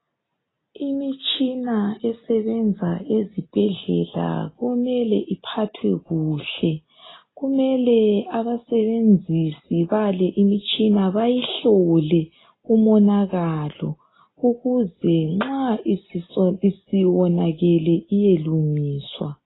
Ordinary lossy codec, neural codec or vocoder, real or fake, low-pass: AAC, 16 kbps; none; real; 7.2 kHz